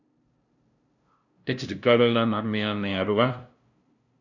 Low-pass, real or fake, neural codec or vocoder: 7.2 kHz; fake; codec, 16 kHz, 0.5 kbps, FunCodec, trained on LibriTTS, 25 frames a second